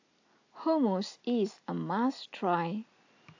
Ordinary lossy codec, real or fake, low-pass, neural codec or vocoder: AAC, 48 kbps; real; 7.2 kHz; none